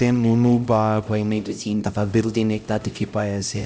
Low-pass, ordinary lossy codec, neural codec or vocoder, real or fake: none; none; codec, 16 kHz, 0.5 kbps, X-Codec, HuBERT features, trained on LibriSpeech; fake